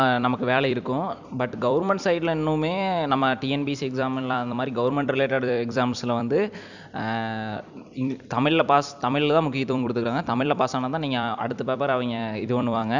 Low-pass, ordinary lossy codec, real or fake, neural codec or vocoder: 7.2 kHz; none; fake; vocoder, 44.1 kHz, 128 mel bands every 256 samples, BigVGAN v2